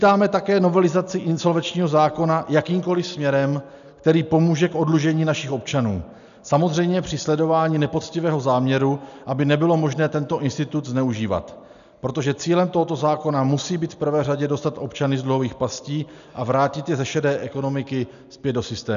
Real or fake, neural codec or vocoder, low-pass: real; none; 7.2 kHz